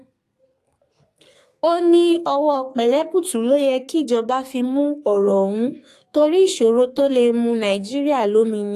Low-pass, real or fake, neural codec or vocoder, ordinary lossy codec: 14.4 kHz; fake; codec, 32 kHz, 1.9 kbps, SNAC; AAC, 64 kbps